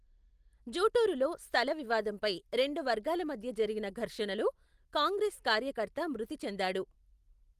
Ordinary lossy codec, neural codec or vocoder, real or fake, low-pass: Opus, 24 kbps; none; real; 14.4 kHz